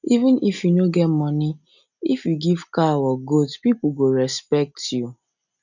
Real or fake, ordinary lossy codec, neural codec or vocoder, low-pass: real; none; none; 7.2 kHz